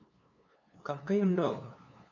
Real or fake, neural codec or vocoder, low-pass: fake; codec, 16 kHz, 2 kbps, FunCodec, trained on LibriTTS, 25 frames a second; 7.2 kHz